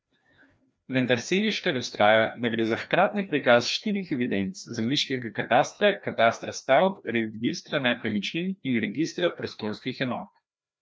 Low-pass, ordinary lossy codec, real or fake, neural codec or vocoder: none; none; fake; codec, 16 kHz, 1 kbps, FreqCodec, larger model